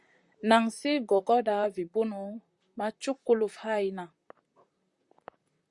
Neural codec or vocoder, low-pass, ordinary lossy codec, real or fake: vocoder, 44.1 kHz, 128 mel bands, Pupu-Vocoder; 10.8 kHz; Opus, 64 kbps; fake